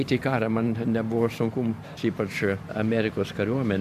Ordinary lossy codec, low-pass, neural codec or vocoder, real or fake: MP3, 96 kbps; 14.4 kHz; vocoder, 44.1 kHz, 128 mel bands every 512 samples, BigVGAN v2; fake